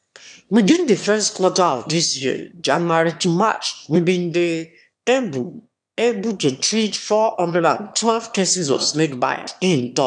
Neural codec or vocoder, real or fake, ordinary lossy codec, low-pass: autoencoder, 22.05 kHz, a latent of 192 numbers a frame, VITS, trained on one speaker; fake; none; 9.9 kHz